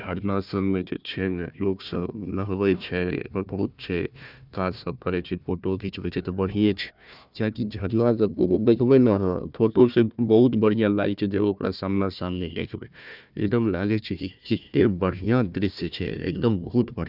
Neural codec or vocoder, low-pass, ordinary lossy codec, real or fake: codec, 16 kHz, 1 kbps, FunCodec, trained on Chinese and English, 50 frames a second; 5.4 kHz; none; fake